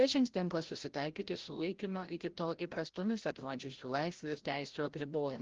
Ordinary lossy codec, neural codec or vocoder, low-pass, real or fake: Opus, 16 kbps; codec, 16 kHz, 0.5 kbps, FreqCodec, larger model; 7.2 kHz; fake